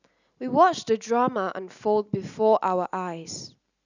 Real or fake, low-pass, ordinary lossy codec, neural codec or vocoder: real; 7.2 kHz; none; none